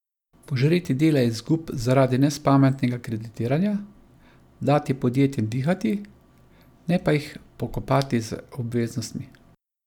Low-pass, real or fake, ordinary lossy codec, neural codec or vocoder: 19.8 kHz; real; none; none